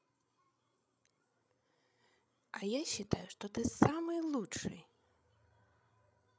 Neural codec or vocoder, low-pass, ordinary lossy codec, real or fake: codec, 16 kHz, 16 kbps, FreqCodec, larger model; none; none; fake